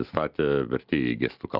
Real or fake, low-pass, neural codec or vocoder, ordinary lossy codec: real; 5.4 kHz; none; Opus, 24 kbps